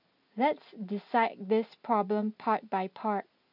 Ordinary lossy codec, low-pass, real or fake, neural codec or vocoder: none; 5.4 kHz; real; none